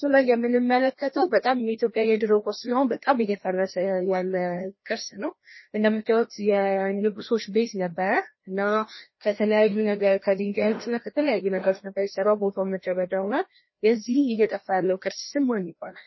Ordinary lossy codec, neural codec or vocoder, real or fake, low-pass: MP3, 24 kbps; codec, 16 kHz, 1 kbps, FreqCodec, larger model; fake; 7.2 kHz